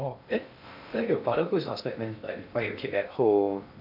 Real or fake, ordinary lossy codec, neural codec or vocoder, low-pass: fake; none; codec, 16 kHz in and 24 kHz out, 0.6 kbps, FocalCodec, streaming, 2048 codes; 5.4 kHz